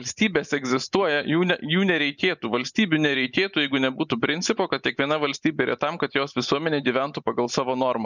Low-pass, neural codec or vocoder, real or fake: 7.2 kHz; none; real